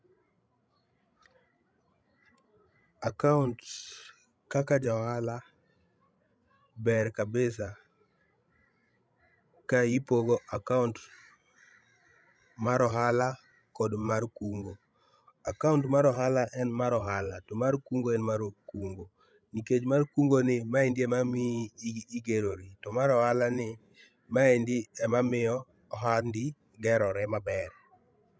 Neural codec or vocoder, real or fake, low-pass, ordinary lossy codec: codec, 16 kHz, 16 kbps, FreqCodec, larger model; fake; none; none